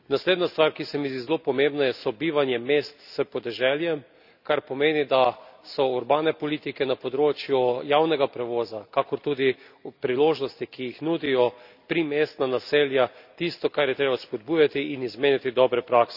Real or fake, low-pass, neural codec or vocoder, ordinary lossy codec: real; 5.4 kHz; none; none